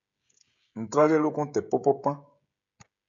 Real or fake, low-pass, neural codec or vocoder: fake; 7.2 kHz; codec, 16 kHz, 16 kbps, FreqCodec, smaller model